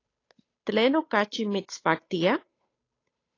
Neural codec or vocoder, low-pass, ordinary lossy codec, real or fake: codec, 16 kHz, 8 kbps, FunCodec, trained on Chinese and English, 25 frames a second; 7.2 kHz; AAC, 32 kbps; fake